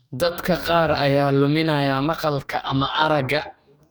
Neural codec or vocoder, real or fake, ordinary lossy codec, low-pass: codec, 44.1 kHz, 2.6 kbps, DAC; fake; none; none